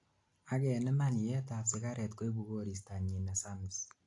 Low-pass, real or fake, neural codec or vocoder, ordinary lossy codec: 10.8 kHz; real; none; AAC, 48 kbps